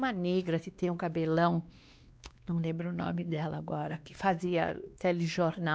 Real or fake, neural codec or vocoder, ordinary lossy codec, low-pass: fake; codec, 16 kHz, 2 kbps, X-Codec, WavLM features, trained on Multilingual LibriSpeech; none; none